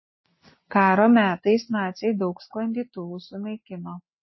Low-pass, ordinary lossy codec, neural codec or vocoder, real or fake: 7.2 kHz; MP3, 24 kbps; none; real